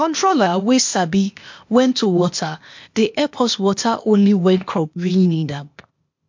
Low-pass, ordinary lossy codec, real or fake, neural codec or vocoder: 7.2 kHz; MP3, 48 kbps; fake; codec, 16 kHz, 0.8 kbps, ZipCodec